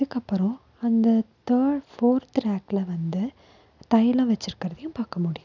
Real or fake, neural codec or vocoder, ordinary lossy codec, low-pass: real; none; none; 7.2 kHz